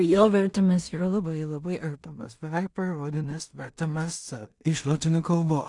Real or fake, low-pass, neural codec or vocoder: fake; 10.8 kHz; codec, 16 kHz in and 24 kHz out, 0.4 kbps, LongCat-Audio-Codec, two codebook decoder